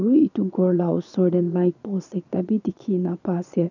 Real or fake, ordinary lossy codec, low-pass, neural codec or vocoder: fake; none; 7.2 kHz; vocoder, 22.05 kHz, 80 mel bands, Vocos